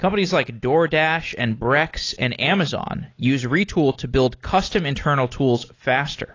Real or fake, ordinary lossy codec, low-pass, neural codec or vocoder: real; AAC, 32 kbps; 7.2 kHz; none